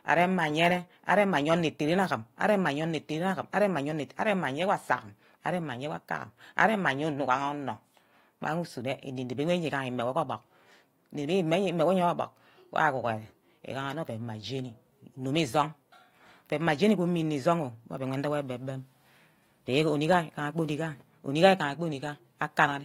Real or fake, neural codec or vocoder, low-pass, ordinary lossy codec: real; none; 19.8 kHz; AAC, 48 kbps